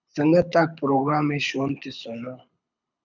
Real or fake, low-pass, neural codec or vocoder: fake; 7.2 kHz; codec, 24 kHz, 6 kbps, HILCodec